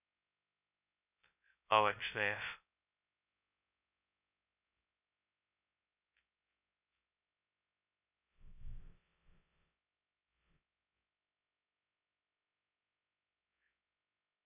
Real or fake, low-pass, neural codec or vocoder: fake; 3.6 kHz; codec, 16 kHz, 0.2 kbps, FocalCodec